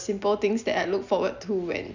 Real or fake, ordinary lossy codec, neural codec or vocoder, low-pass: real; none; none; 7.2 kHz